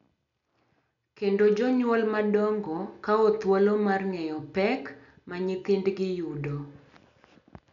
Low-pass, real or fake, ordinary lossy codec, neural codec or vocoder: 7.2 kHz; real; none; none